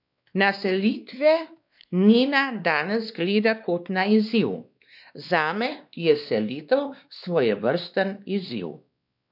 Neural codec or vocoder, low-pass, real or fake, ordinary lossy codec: codec, 16 kHz, 2 kbps, X-Codec, WavLM features, trained on Multilingual LibriSpeech; 5.4 kHz; fake; none